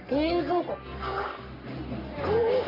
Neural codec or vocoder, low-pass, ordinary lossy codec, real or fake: codec, 44.1 kHz, 3.4 kbps, Pupu-Codec; 5.4 kHz; none; fake